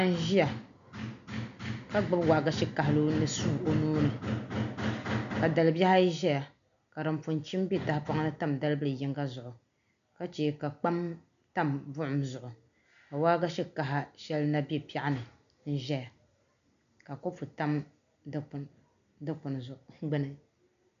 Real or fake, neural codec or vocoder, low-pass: real; none; 7.2 kHz